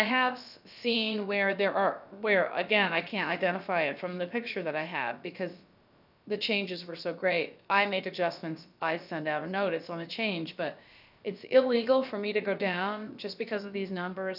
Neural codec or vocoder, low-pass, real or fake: codec, 16 kHz, about 1 kbps, DyCAST, with the encoder's durations; 5.4 kHz; fake